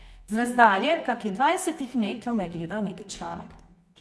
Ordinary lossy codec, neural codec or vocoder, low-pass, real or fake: none; codec, 24 kHz, 0.9 kbps, WavTokenizer, medium music audio release; none; fake